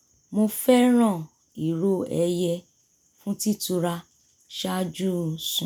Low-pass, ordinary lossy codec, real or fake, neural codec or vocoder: none; none; real; none